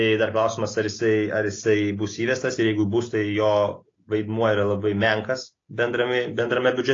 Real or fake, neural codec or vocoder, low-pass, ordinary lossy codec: real; none; 7.2 kHz; AAC, 32 kbps